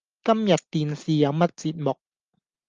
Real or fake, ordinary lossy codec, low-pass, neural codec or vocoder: real; Opus, 32 kbps; 7.2 kHz; none